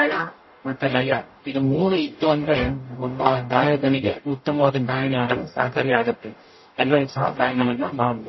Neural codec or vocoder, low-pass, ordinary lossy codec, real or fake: codec, 44.1 kHz, 0.9 kbps, DAC; 7.2 kHz; MP3, 24 kbps; fake